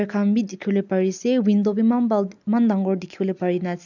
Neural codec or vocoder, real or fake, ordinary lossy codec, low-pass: none; real; none; 7.2 kHz